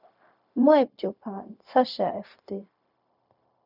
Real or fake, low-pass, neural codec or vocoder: fake; 5.4 kHz; codec, 16 kHz, 0.4 kbps, LongCat-Audio-Codec